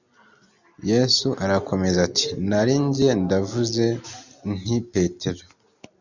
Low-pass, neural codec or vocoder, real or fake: 7.2 kHz; none; real